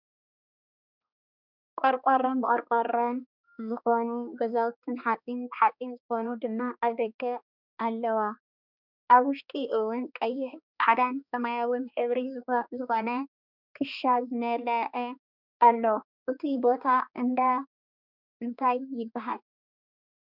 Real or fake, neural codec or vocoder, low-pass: fake; codec, 16 kHz, 2 kbps, X-Codec, HuBERT features, trained on balanced general audio; 5.4 kHz